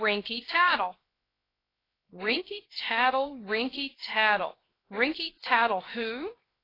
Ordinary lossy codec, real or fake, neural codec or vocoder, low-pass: AAC, 24 kbps; fake; codec, 16 kHz, 0.7 kbps, FocalCodec; 5.4 kHz